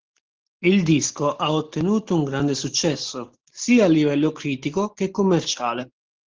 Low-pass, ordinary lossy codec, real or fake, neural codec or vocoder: 7.2 kHz; Opus, 16 kbps; real; none